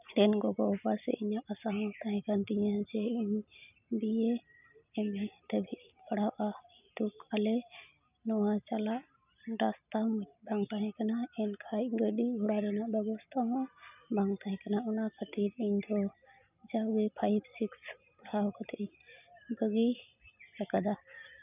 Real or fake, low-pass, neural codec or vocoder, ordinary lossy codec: real; 3.6 kHz; none; none